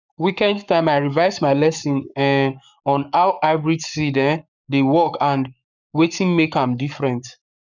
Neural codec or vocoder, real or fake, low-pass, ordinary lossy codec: codec, 44.1 kHz, 7.8 kbps, Pupu-Codec; fake; 7.2 kHz; none